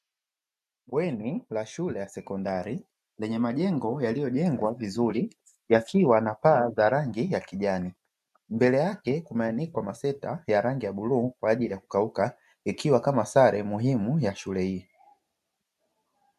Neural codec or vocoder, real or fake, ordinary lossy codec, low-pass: none; real; MP3, 96 kbps; 14.4 kHz